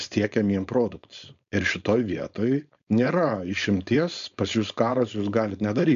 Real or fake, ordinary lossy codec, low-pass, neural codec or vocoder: fake; MP3, 64 kbps; 7.2 kHz; codec, 16 kHz, 4.8 kbps, FACodec